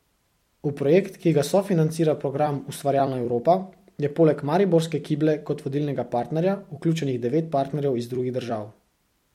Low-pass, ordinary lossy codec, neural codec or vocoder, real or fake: 19.8 kHz; MP3, 64 kbps; vocoder, 44.1 kHz, 128 mel bands every 256 samples, BigVGAN v2; fake